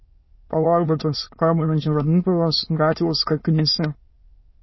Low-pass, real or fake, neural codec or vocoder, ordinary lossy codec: 7.2 kHz; fake; autoencoder, 22.05 kHz, a latent of 192 numbers a frame, VITS, trained on many speakers; MP3, 24 kbps